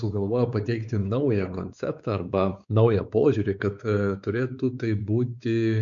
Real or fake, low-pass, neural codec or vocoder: fake; 7.2 kHz; codec, 16 kHz, 4 kbps, X-Codec, WavLM features, trained on Multilingual LibriSpeech